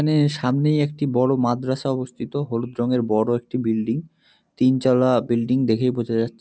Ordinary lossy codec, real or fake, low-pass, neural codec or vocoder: none; real; none; none